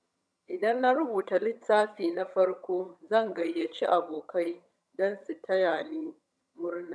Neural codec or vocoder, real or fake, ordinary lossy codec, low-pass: vocoder, 22.05 kHz, 80 mel bands, HiFi-GAN; fake; none; none